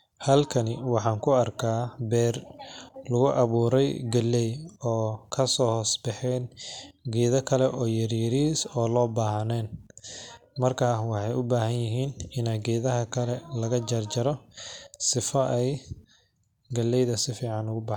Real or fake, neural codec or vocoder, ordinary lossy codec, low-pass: real; none; none; 19.8 kHz